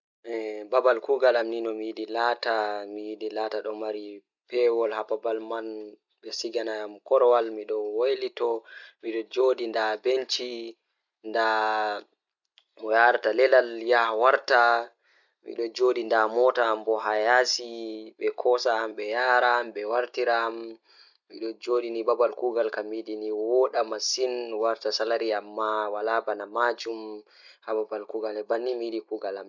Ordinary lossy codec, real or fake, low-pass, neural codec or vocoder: none; real; 7.2 kHz; none